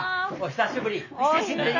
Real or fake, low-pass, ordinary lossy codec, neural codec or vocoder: real; 7.2 kHz; none; none